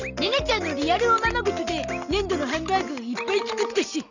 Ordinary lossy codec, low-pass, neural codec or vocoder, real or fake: none; 7.2 kHz; none; real